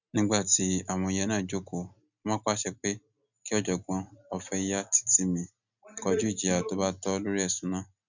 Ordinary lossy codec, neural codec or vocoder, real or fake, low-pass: none; none; real; 7.2 kHz